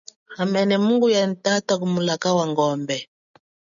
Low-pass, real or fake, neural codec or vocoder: 7.2 kHz; real; none